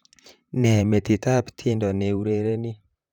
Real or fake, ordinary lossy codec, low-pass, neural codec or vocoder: fake; none; 19.8 kHz; vocoder, 44.1 kHz, 128 mel bands, Pupu-Vocoder